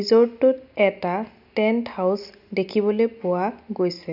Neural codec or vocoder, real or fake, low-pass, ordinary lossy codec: none; real; 5.4 kHz; none